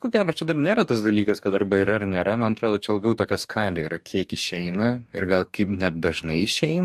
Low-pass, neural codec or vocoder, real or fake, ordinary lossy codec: 14.4 kHz; codec, 44.1 kHz, 2.6 kbps, DAC; fake; AAC, 96 kbps